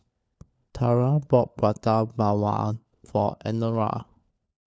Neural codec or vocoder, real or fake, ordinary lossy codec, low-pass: codec, 16 kHz, 4 kbps, FunCodec, trained on LibriTTS, 50 frames a second; fake; none; none